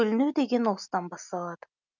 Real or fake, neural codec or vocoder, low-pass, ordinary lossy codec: real; none; 7.2 kHz; none